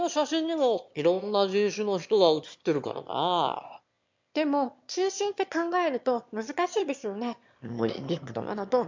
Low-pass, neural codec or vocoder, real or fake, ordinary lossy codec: 7.2 kHz; autoencoder, 22.05 kHz, a latent of 192 numbers a frame, VITS, trained on one speaker; fake; MP3, 64 kbps